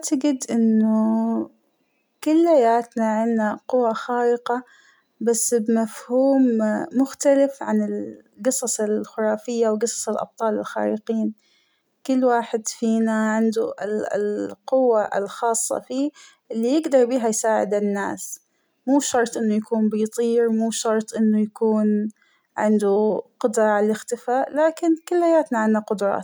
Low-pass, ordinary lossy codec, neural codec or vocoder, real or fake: none; none; none; real